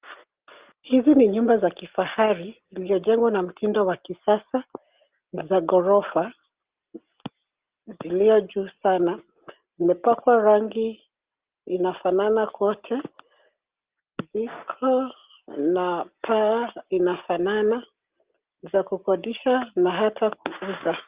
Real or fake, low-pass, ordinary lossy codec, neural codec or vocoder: real; 3.6 kHz; Opus, 32 kbps; none